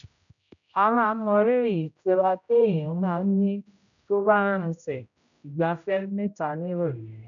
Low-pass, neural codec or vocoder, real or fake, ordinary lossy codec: 7.2 kHz; codec, 16 kHz, 0.5 kbps, X-Codec, HuBERT features, trained on general audio; fake; none